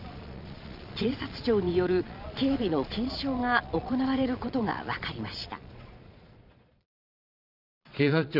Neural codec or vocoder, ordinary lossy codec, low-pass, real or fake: vocoder, 22.05 kHz, 80 mel bands, Vocos; none; 5.4 kHz; fake